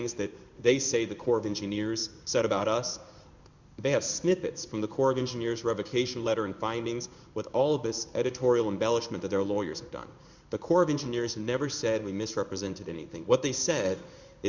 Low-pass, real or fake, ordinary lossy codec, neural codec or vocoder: 7.2 kHz; fake; Opus, 64 kbps; codec, 16 kHz in and 24 kHz out, 1 kbps, XY-Tokenizer